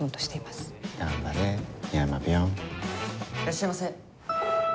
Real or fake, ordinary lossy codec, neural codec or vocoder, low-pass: real; none; none; none